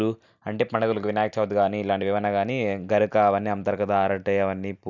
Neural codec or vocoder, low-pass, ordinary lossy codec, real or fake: none; 7.2 kHz; none; real